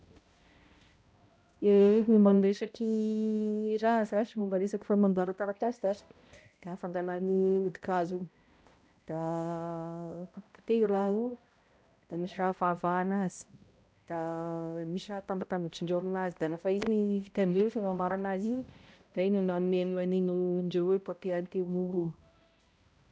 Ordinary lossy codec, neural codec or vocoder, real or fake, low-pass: none; codec, 16 kHz, 0.5 kbps, X-Codec, HuBERT features, trained on balanced general audio; fake; none